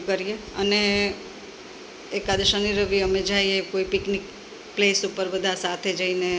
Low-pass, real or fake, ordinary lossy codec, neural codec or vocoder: none; real; none; none